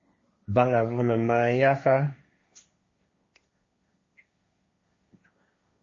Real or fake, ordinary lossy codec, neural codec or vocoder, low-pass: fake; MP3, 32 kbps; codec, 16 kHz, 1.1 kbps, Voila-Tokenizer; 7.2 kHz